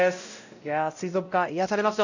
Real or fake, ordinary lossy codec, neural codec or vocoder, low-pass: fake; none; codec, 16 kHz, 0.5 kbps, X-Codec, WavLM features, trained on Multilingual LibriSpeech; 7.2 kHz